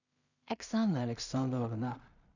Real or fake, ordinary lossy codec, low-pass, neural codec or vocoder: fake; none; 7.2 kHz; codec, 16 kHz in and 24 kHz out, 0.4 kbps, LongCat-Audio-Codec, two codebook decoder